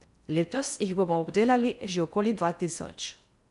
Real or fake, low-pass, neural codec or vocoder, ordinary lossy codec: fake; 10.8 kHz; codec, 16 kHz in and 24 kHz out, 0.6 kbps, FocalCodec, streaming, 4096 codes; none